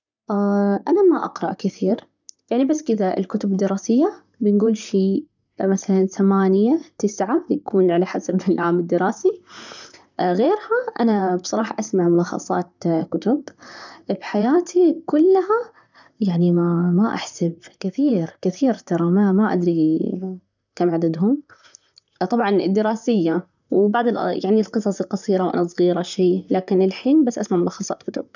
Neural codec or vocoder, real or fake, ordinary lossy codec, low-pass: vocoder, 44.1 kHz, 128 mel bands, Pupu-Vocoder; fake; none; 7.2 kHz